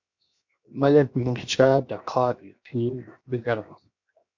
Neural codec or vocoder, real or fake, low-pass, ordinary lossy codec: codec, 16 kHz, 0.7 kbps, FocalCodec; fake; 7.2 kHz; MP3, 64 kbps